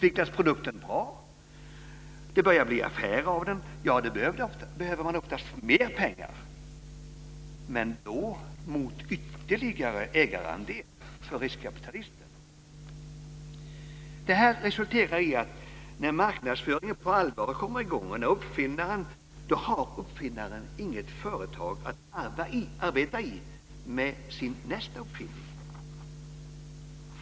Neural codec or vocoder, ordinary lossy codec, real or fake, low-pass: none; none; real; none